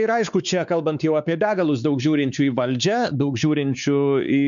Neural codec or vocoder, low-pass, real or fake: codec, 16 kHz, 4 kbps, X-Codec, WavLM features, trained on Multilingual LibriSpeech; 7.2 kHz; fake